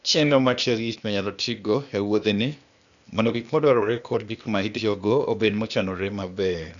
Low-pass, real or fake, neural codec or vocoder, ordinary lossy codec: 7.2 kHz; fake; codec, 16 kHz, 0.8 kbps, ZipCodec; none